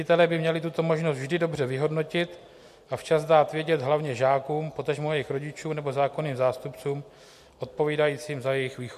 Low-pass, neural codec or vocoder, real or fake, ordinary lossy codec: 14.4 kHz; none; real; MP3, 64 kbps